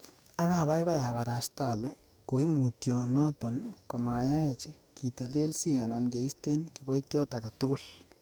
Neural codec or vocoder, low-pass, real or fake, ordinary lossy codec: codec, 44.1 kHz, 2.6 kbps, DAC; none; fake; none